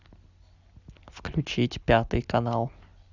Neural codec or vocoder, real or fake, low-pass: none; real; 7.2 kHz